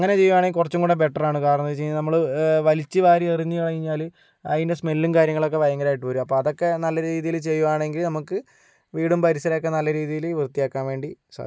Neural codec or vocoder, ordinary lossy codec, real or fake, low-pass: none; none; real; none